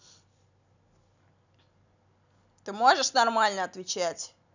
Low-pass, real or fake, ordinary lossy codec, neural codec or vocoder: 7.2 kHz; real; none; none